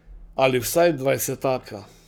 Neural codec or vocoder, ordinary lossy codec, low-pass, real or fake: codec, 44.1 kHz, 7.8 kbps, Pupu-Codec; none; none; fake